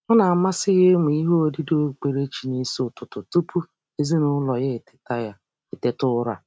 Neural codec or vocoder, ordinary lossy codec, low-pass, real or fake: none; none; none; real